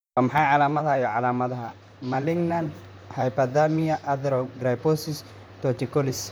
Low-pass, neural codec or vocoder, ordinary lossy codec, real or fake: none; vocoder, 44.1 kHz, 128 mel bands, Pupu-Vocoder; none; fake